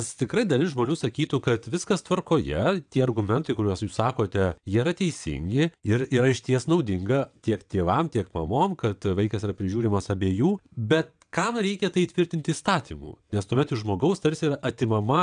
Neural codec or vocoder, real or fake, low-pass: vocoder, 22.05 kHz, 80 mel bands, WaveNeXt; fake; 9.9 kHz